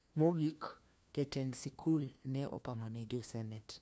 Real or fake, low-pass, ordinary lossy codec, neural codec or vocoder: fake; none; none; codec, 16 kHz, 1 kbps, FunCodec, trained on LibriTTS, 50 frames a second